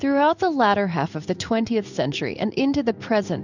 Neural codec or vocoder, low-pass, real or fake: codec, 16 kHz in and 24 kHz out, 1 kbps, XY-Tokenizer; 7.2 kHz; fake